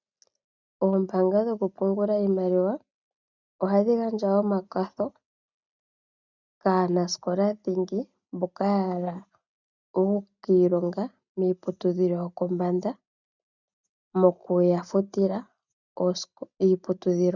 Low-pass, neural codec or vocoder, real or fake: 7.2 kHz; none; real